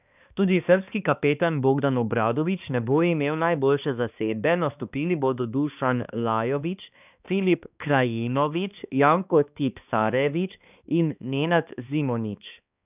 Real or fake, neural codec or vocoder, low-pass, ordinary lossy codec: fake; codec, 16 kHz, 2 kbps, X-Codec, HuBERT features, trained on balanced general audio; 3.6 kHz; none